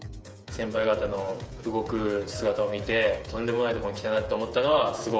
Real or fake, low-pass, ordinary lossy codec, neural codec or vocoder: fake; none; none; codec, 16 kHz, 8 kbps, FreqCodec, smaller model